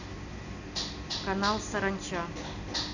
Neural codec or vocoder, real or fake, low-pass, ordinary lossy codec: none; real; 7.2 kHz; none